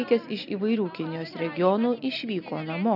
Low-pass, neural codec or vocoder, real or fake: 5.4 kHz; none; real